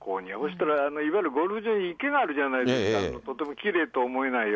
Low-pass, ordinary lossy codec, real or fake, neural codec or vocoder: none; none; real; none